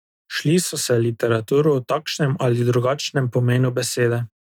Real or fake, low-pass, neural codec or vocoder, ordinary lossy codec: real; 19.8 kHz; none; none